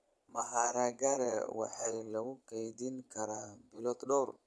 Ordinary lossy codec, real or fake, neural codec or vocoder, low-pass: none; fake; vocoder, 22.05 kHz, 80 mel bands, Vocos; none